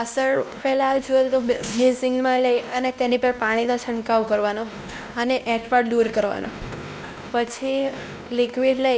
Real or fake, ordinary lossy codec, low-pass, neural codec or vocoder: fake; none; none; codec, 16 kHz, 1 kbps, X-Codec, WavLM features, trained on Multilingual LibriSpeech